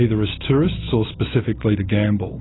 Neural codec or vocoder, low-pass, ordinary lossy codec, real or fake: none; 7.2 kHz; AAC, 16 kbps; real